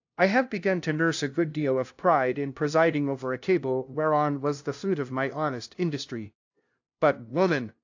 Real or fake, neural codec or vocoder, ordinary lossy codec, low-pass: fake; codec, 16 kHz, 0.5 kbps, FunCodec, trained on LibriTTS, 25 frames a second; AAC, 48 kbps; 7.2 kHz